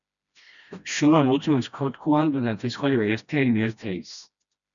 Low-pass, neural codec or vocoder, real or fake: 7.2 kHz; codec, 16 kHz, 1 kbps, FreqCodec, smaller model; fake